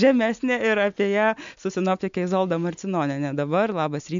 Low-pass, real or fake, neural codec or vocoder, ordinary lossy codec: 7.2 kHz; real; none; MP3, 64 kbps